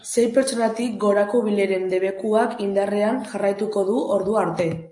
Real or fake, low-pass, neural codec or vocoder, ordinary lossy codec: real; 10.8 kHz; none; AAC, 64 kbps